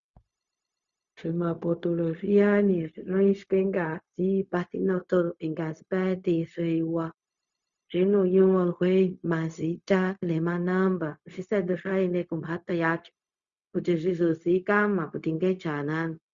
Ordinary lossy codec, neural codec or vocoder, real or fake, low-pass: Opus, 64 kbps; codec, 16 kHz, 0.4 kbps, LongCat-Audio-Codec; fake; 7.2 kHz